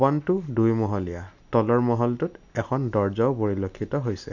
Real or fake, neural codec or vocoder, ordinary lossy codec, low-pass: real; none; none; 7.2 kHz